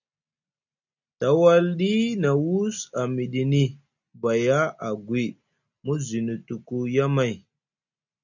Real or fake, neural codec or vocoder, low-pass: real; none; 7.2 kHz